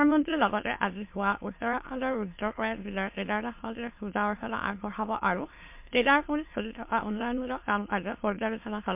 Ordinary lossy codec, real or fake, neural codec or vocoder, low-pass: MP3, 32 kbps; fake; autoencoder, 22.05 kHz, a latent of 192 numbers a frame, VITS, trained on many speakers; 3.6 kHz